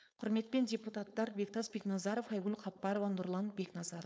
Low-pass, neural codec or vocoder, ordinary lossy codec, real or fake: none; codec, 16 kHz, 4.8 kbps, FACodec; none; fake